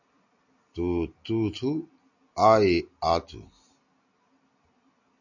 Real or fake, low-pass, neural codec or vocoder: real; 7.2 kHz; none